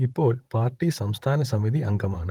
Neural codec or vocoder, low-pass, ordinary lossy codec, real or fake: vocoder, 44.1 kHz, 128 mel bands, Pupu-Vocoder; 19.8 kHz; Opus, 24 kbps; fake